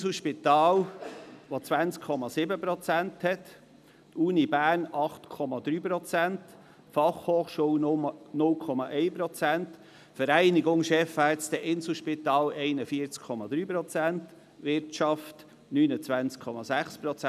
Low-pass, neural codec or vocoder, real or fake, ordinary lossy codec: 14.4 kHz; none; real; none